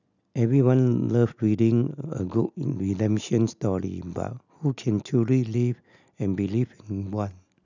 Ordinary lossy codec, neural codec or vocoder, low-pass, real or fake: none; none; 7.2 kHz; real